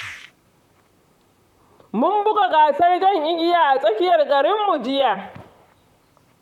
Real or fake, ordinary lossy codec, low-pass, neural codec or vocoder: fake; none; 19.8 kHz; vocoder, 44.1 kHz, 128 mel bands, Pupu-Vocoder